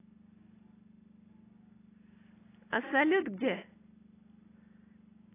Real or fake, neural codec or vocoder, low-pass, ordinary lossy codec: real; none; 3.6 kHz; AAC, 16 kbps